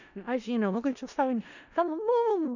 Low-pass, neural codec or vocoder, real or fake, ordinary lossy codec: 7.2 kHz; codec, 16 kHz in and 24 kHz out, 0.4 kbps, LongCat-Audio-Codec, four codebook decoder; fake; none